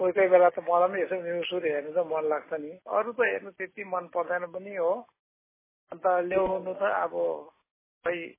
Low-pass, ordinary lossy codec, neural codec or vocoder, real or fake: 3.6 kHz; MP3, 16 kbps; none; real